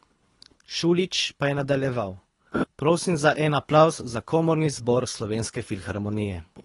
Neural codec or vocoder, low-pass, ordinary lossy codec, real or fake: codec, 24 kHz, 3 kbps, HILCodec; 10.8 kHz; AAC, 32 kbps; fake